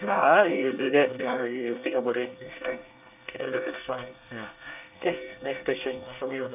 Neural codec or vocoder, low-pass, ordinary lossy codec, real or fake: codec, 24 kHz, 1 kbps, SNAC; 3.6 kHz; none; fake